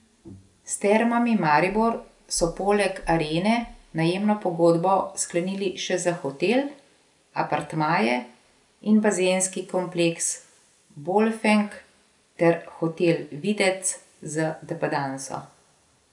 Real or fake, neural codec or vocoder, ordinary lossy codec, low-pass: real; none; none; 10.8 kHz